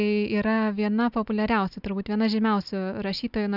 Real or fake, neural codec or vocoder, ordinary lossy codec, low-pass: real; none; Opus, 64 kbps; 5.4 kHz